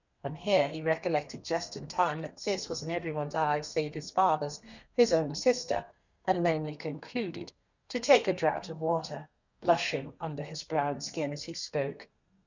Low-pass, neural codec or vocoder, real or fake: 7.2 kHz; codec, 32 kHz, 1.9 kbps, SNAC; fake